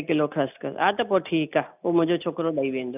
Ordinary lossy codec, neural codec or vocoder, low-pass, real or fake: none; none; 3.6 kHz; real